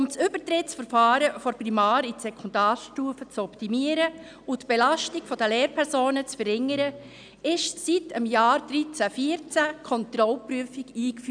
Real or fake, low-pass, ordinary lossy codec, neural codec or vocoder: real; 9.9 kHz; none; none